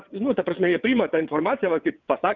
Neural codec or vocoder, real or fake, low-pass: vocoder, 22.05 kHz, 80 mel bands, WaveNeXt; fake; 7.2 kHz